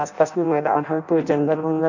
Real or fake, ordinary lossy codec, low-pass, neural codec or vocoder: fake; none; 7.2 kHz; codec, 16 kHz in and 24 kHz out, 0.6 kbps, FireRedTTS-2 codec